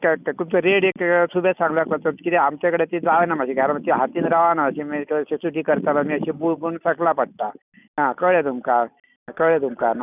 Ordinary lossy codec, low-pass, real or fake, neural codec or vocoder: none; 3.6 kHz; real; none